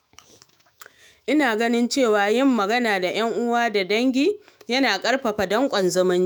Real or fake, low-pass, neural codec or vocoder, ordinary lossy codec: fake; none; autoencoder, 48 kHz, 128 numbers a frame, DAC-VAE, trained on Japanese speech; none